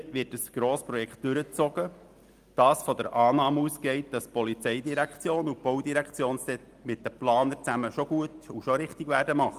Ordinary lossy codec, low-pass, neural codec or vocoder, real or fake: Opus, 32 kbps; 14.4 kHz; none; real